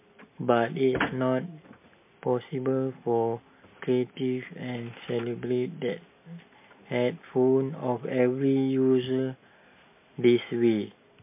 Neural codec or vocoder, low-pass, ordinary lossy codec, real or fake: none; 3.6 kHz; MP3, 24 kbps; real